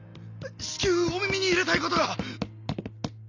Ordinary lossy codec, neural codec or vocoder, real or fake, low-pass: none; none; real; 7.2 kHz